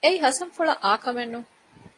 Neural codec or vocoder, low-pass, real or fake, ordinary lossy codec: none; 10.8 kHz; real; AAC, 32 kbps